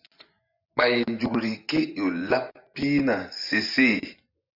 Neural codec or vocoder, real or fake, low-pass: vocoder, 24 kHz, 100 mel bands, Vocos; fake; 5.4 kHz